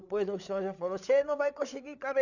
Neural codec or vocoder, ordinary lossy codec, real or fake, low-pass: codec, 16 kHz, 8 kbps, FreqCodec, larger model; none; fake; 7.2 kHz